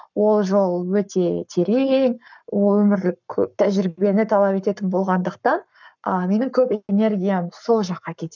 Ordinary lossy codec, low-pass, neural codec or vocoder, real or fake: none; 7.2 kHz; codec, 44.1 kHz, 7.8 kbps, Pupu-Codec; fake